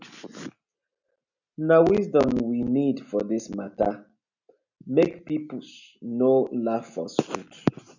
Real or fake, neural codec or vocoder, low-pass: real; none; 7.2 kHz